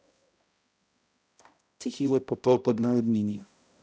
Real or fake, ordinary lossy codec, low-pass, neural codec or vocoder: fake; none; none; codec, 16 kHz, 0.5 kbps, X-Codec, HuBERT features, trained on balanced general audio